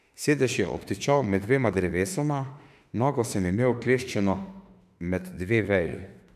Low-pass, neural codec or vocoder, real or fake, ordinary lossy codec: 14.4 kHz; autoencoder, 48 kHz, 32 numbers a frame, DAC-VAE, trained on Japanese speech; fake; none